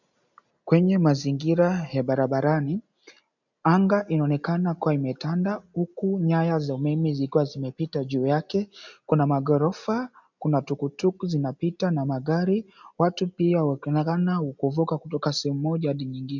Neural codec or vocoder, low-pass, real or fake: none; 7.2 kHz; real